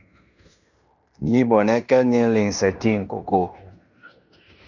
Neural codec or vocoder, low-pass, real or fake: codec, 16 kHz in and 24 kHz out, 0.9 kbps, LongCat-Audio-Codec, fine tuned four codebook decoder; 7.2 kHz; fake